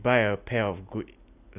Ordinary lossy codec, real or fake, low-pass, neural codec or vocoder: AAC, 32 kbps; real; 3.6 kHz; none